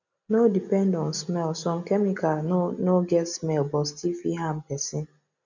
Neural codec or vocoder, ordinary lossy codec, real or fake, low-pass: none; none; real; 7.2 kHz